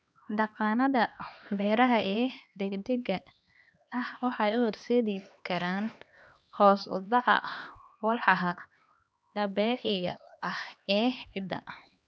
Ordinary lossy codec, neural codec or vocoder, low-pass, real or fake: none; codec, 16 kHz, 2 kbps, X-Codec, HuBERT features, trained on LibriSpeech; none; fake